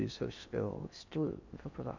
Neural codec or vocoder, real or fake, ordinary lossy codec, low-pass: codec, 16 kHz in and 24 kHz out, 0.6 kbps, FocalCodec, streaming, 4096 codes; fake; none; 7.2 kHz